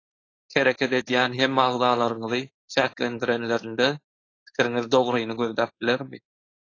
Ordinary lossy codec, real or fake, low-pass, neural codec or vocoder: AAC, 32 kbps; fake; 7.2 kHz; codec, 16 kHz, 4.8 kbps, FACodec